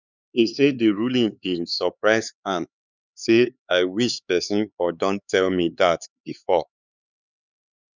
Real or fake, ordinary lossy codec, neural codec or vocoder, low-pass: fake; none; codec, 16 kHz, 4 kbps, X-Codec, HuBERT features, trained on LibriSpeech; 7.2 kHz